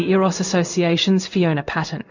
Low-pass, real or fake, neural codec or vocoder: 7.2 kHz; fake; codec, 16 kHz in and 24 kHz out, 1 kbps, XY-Tokenizer